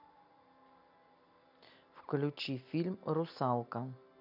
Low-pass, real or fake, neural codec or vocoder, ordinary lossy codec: 5.4 kHz; real; none; none